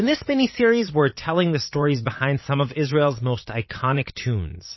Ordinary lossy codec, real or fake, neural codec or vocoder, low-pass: MP3, 24 kbps; fake; vocoder, 22.05 kHz, 80 mel bands, Vocos; 7.2 kHz